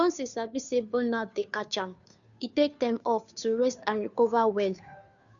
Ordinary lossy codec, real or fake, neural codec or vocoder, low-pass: none; fake; codec, 16 kHz, 2 kbps, FunCodec, trained on Chinese and English, 25 frames a second; 7.2 kHz